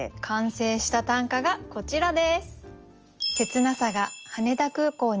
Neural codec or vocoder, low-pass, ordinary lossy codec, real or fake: none; 7.2 kHz; Opus, 24 kbps; real